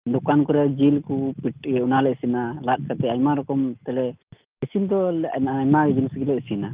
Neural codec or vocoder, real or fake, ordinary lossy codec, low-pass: none; real; Opus, 32 kbps; 3.6 kHz